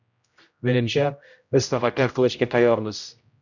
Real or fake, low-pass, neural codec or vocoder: fake; 7.2 kHz; codec, 16 kHz, 0.5 kbps, X-Codec, HuBERT features, trained on general audio